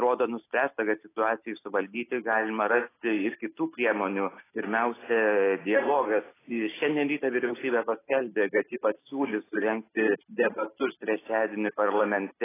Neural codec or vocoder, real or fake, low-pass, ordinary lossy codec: none; real; 3.6 kHz; AAC, 16 kbps